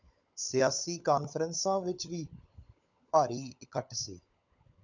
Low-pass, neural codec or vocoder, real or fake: 7.2 kHz; codec, 16 kHz, 16 kbps, FunCodec, trained on LibriTTS, 50 frames a second; fake